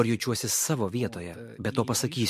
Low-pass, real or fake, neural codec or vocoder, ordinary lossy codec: 14.4 kHz; fake; vocoder, 44.1 kHz, 128 mel bands every 256 samples, BigVGAN v2; MP3, 64 kbps